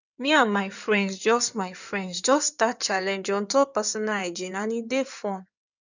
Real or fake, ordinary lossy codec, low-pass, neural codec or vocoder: fake; none; 7.2 kHz; codec, 16 kHz in and 24 kHz out, 2.2 kbps, FireRedTTS-2 codec